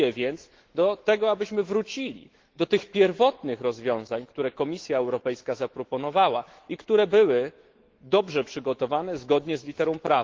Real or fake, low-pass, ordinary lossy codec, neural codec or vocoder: fake; 7.2 kHz; Opus, 16 kbps; autoencoder, 48 kHz, 128 numbers a frame, DAC-VAE, trained on Japanese speech